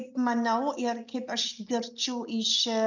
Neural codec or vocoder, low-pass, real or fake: none; 7.2 kHz; real